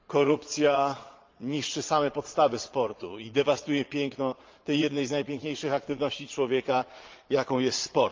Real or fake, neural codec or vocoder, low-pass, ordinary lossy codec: fake; vocoder, 22.05 kHz, 80 mel bands, Vocos; 7.2 kHz; Opus, 32 kbps